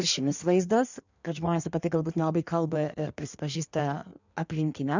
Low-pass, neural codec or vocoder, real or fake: 7.2 kHz; codec, 16 kHz in and 24 kHz out, 1.1 kbps, FireRedTTS-2 codec; fake